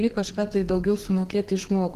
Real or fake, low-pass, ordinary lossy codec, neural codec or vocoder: fake; 14.4 kHz; Opus, 16 kbps; codec, 32 kHz, 1.9 kbps, SNAC